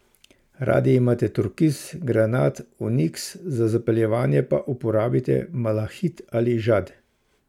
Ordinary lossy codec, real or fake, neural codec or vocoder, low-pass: MP3, 96 kbps; real; none; 19.8 kHz